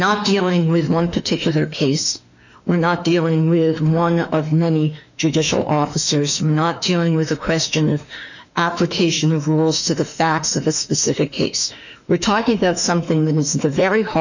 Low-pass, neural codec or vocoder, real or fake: 7.2 kHz; autoencoder, 48 kHz, 32 numbers a frame, DAC-VAE, trained on Japanese speech; fake